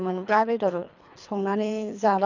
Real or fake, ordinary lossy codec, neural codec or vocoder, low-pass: fake; none; codec, 24 kHz, 3 kbps, HILCodec; 7.2 kHz